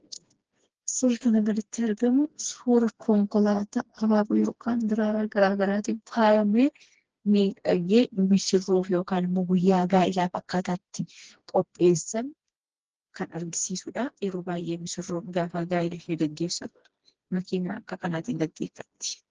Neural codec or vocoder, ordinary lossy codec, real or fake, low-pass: codec, 16 kHz, 2 kbps, FreqCodec, smaller model; Opus, 16 kbps; fake; 7.2 kHz